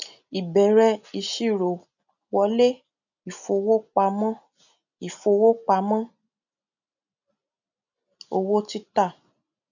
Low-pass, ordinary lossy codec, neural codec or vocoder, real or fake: 7.2 kHz; none; none; real